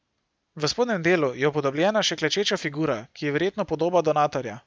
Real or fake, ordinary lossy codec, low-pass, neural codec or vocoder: real; none; none; none